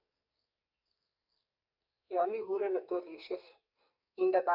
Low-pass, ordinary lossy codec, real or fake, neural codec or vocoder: 5.4 kHz; none; fake; codec, 44.1 kHz, 2.6 kbps, SNAC